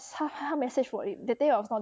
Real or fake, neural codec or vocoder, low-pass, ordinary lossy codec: real; none; none; none